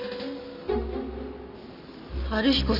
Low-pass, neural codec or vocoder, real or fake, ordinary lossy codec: 5.4 kHz; none; real; none